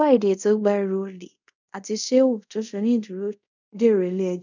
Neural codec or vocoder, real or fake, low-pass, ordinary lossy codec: codec, 24 kHz, 0.5 kbps, DualCodec; fake; 7.2 kHz; none